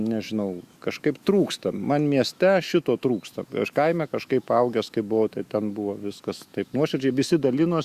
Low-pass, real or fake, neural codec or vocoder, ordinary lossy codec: 14.4 kHz; fake; autoencoder, 48 kHz, 128 numbers a frame, DAC-VAE, trained on Japanese speech; Opus, 64 kbps